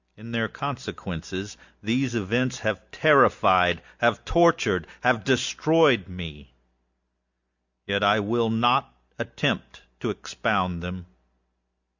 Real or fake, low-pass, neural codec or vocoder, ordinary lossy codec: real; 7.2 kHz; none; Opus, 64 kbps